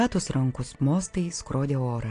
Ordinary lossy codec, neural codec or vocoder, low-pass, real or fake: AAC, 48 kbps; none; 9.9 kHz; real